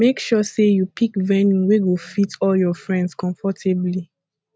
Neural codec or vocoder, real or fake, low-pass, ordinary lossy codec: none; real; none; none